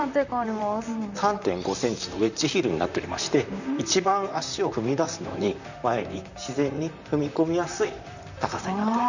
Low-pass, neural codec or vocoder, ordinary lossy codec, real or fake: 7.2 kHz; vocoder, 44.1 kHz, 128 mel bands, Pupu-Vocoder; none; fake